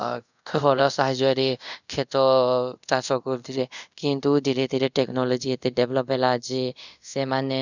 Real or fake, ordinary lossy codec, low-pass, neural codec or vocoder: fake; none; 7.2 kHz; codec, 24 kHz, 0.5 kbps, DualCodec